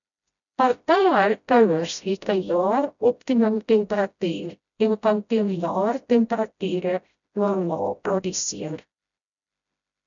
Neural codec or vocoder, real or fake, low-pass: codec, 16 kHz, 0.5 kbps, FreqCodec, smaller model; fake; 7.2 kHz